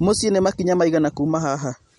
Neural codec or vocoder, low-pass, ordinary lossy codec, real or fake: none; 19.8 kHz; MP3, 48 kbps; real